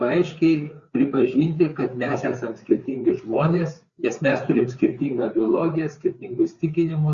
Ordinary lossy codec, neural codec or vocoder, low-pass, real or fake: Opus, 64 kbps; codec, 16 kHz, 4 kbps, FreqCodec, larger model; 7.2 kHz; fake